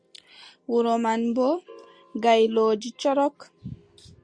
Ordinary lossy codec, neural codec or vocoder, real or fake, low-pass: Opus, 64 kbps; none; real; 9.9 kHz